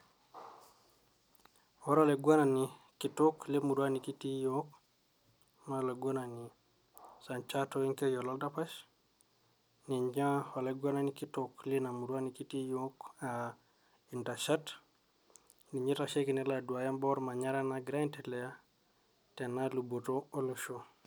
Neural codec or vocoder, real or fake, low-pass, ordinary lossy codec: none; real; none; none